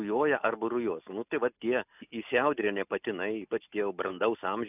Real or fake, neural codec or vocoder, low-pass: real; none; 3.6 kHz